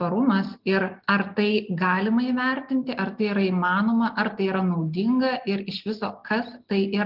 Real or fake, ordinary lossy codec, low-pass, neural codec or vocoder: real; Opus, 32 kbps; 5.4 kHz; none